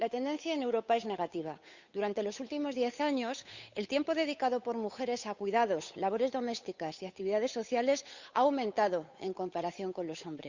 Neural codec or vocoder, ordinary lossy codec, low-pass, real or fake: codec, 16 kHz, 8 kbps, FunCodec, trained on Chinese and English, 25 frames a second; Opus, 64 kbps; 7.2 kHz; fake